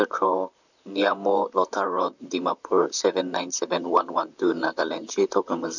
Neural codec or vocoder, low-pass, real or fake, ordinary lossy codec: vocoder, 44.1 kHz, 128 mel bands, Pupu-Vocoder; 7.2 kHz; fake; none